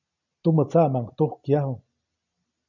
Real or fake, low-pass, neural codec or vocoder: real; 7.2 kHz; none